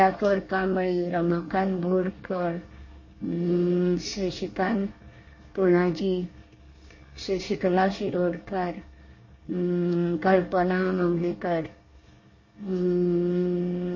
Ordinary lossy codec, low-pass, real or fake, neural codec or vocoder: MP3, 32 kbps; 7.2 kHz; fake; codec, 24 kHz, 1 kbps, SNAC